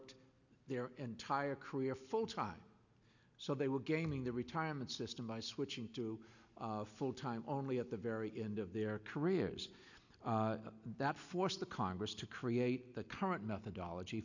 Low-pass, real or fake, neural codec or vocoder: 7.2 kHz; real; none